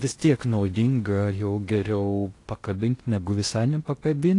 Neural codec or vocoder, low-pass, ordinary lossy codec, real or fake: codec, 16 kHz in and 24 kHz out, 0.6 kbps, FocalCodec, streaming, 2048 codes; 10.8 kHz; AAC, 48 kbps; fake